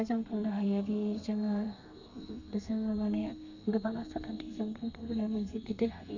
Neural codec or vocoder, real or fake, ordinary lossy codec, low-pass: codec, 32 kHz, 1.9 kbps, SNAC; fake; none; 7.2 kHz